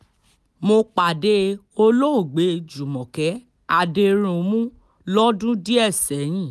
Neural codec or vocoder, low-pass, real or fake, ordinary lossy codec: none; none; real; none